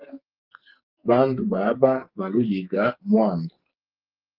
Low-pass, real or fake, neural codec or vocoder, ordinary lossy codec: 5.4 kHz; fake; codec, 44.1 kHz, 2.6 kbps, SNAC; Opus, 32 kbps